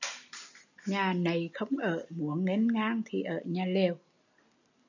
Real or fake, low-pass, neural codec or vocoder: real; 7.2 kHz; none